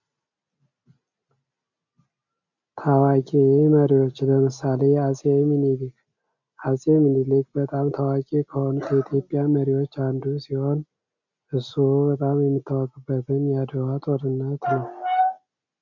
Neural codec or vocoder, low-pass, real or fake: none; 7.2 kHz; real